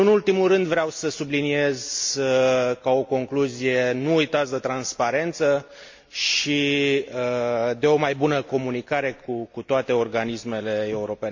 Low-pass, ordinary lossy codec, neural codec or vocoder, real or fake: 7.2 kHz; MP3, 48 kbps; none; real